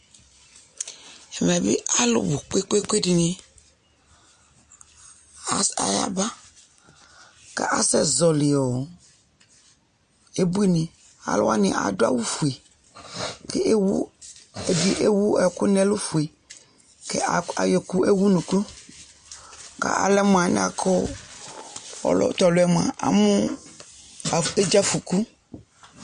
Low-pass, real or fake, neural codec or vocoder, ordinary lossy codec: 9.9 kHz; real; none; MP3, 48 kbps